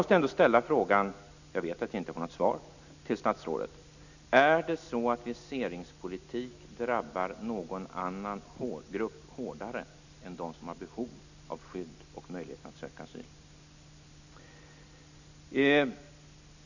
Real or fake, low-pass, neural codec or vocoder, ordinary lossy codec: real; 7.2 kHz; none; none